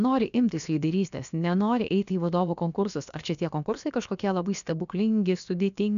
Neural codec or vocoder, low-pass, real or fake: codec, 16 kHz, about 1 kbps, DyCAST, with the encoder's durations; 7.2 kHz; fake